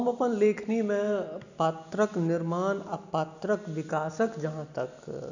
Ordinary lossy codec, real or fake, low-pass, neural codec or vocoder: none; real; 7.2 kHz; none